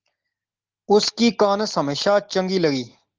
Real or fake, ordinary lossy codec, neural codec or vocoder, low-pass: real; Opus, 16 kbps; none; 7.2 kHz